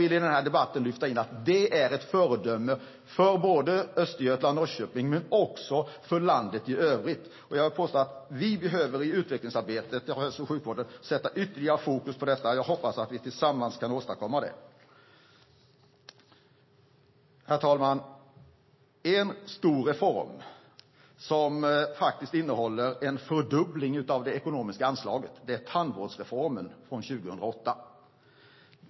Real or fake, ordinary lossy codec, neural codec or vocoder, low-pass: real; MP3, 24 kbps; none; 7.2 kHz